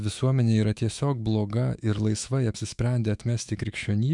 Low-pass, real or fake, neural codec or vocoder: 10.8 kHz; fake; autoencoder, 48 kHz, 128 numbers a frame, DAC-VAE, trained on Japanese speech